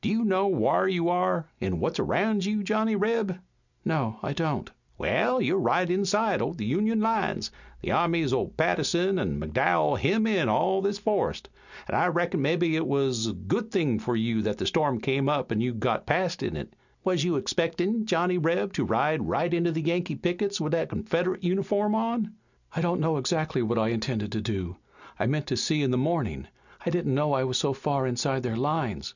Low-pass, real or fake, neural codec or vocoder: 7.2 kHz; real; none